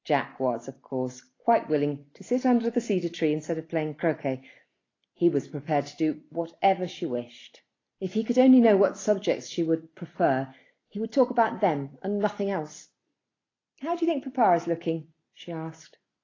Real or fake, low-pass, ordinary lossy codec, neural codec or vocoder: real; 7.2 kHz; AAC, 32 kbps; none